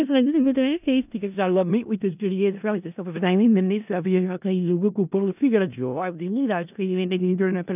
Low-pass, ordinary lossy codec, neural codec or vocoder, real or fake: 3.6 kHz; none; codec, 16 kHz in and 24 kHz out, 0.4 kbps, LongCat-Audio-Codec, four codebook decoder; fake